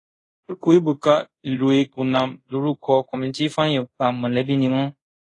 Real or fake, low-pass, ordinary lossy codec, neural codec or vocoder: fake; 10.8 kHz; AAC, 32 kbps; codec, 24 kHz, 0.5 kbps, DualCodec